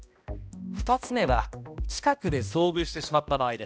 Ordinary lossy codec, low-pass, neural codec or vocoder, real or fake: none; none; codec, 16 kHz, 1 kbps, X-Codec, HuBERT features, trained on balanced general audio; fake